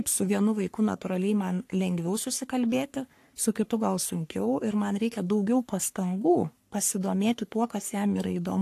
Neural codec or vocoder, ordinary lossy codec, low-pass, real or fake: codec, 44.1 kHz, 3.4 kbps, Pupu-Codec; AAC, 64 kbps; 14.4 kHz; fake